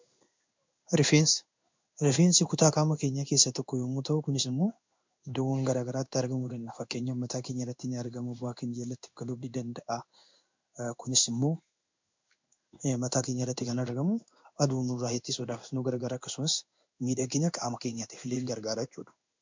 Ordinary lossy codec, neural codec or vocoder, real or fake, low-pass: MP3, 64 kbps; codec, 16 kHz in and 24 kHz out, 1 kbps, XY-Tokenizer; fake; 7.2 kHz